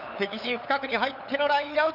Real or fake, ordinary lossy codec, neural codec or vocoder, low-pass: fake; none; codec, 16 kHz, 8 kbps, FunCodec, trained on LibriTTS, 25 frames a second; 5.4 kHz